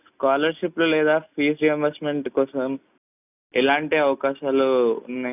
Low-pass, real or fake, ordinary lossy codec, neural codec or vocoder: 3.6 kHz; real; none; none